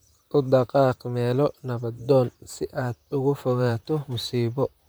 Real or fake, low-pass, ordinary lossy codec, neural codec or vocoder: fake; none; none; vocoder, 44.1 kHz, 128 mel bands, Pupu-Vocoder